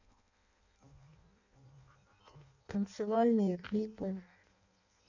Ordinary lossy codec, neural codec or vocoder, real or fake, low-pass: none; codec, 16 kHz in and 24 kHz out, 0.6 kbps, FireRedTTS-2 codec; fake; 7.2 kHz